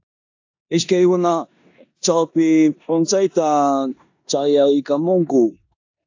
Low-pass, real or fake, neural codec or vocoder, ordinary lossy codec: 7.2 kHz; fake; codec, 16 kHz in and 24 kHz out, 0.9 kbps, LongCat-Audio-Codec, four codebook decoder; AAC, 48 kbps